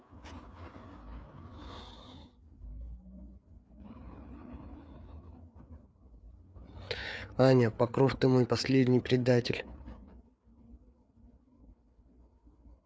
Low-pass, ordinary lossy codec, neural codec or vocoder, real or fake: none; none; codec, 16 kHz, 4 kbps, FreqCodec, larger model; fake